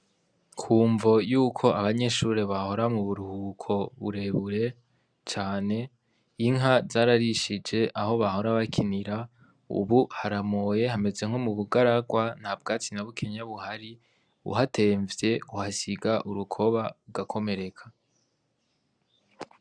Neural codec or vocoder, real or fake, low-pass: none; real; 9.9 kHz